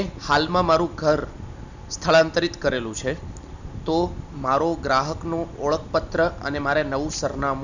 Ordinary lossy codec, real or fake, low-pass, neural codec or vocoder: none; real; 7.2 kHz; none